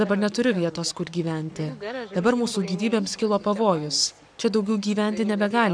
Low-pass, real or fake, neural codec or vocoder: 9.9 kHz; fake; codec, 44.1 kHz, 7.8 kbps, Pupu-Codec